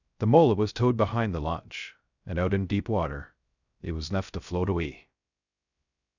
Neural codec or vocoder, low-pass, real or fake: codec, 16 kHz, 0.3 kbps, FocalCodec; 7.2 kHz; fake